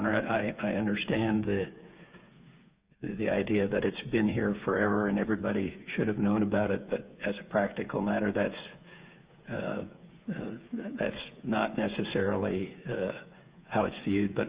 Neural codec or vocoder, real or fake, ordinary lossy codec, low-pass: codec, 16 kHz, 8 kbps, FreqCodec, smaller model; fake; Opus, 64 kbps; 3.6 kHz